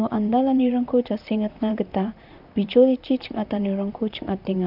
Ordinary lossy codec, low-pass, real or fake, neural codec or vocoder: none; 5.4 kHz; fake; vocoder, 44.1 kHz, 128 mel bands, Pupu-Vocoder